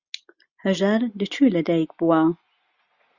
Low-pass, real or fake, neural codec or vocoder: 7.2 kHz; real; none